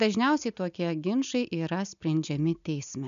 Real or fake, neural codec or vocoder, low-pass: real; none; 7.2 kHz